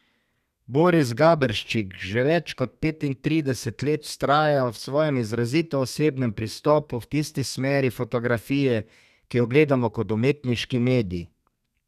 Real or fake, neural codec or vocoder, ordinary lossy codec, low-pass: fake; codec, 32 kHz, 1.9 kbps, SNAC; none; 14.4 kHz